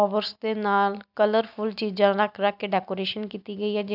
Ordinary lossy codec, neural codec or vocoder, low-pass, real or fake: none; none; 5.4 kHz; real